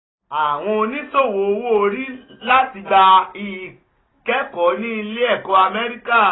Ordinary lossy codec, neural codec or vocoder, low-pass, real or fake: AAC, 16 kbps; none; 7.2 kHz; real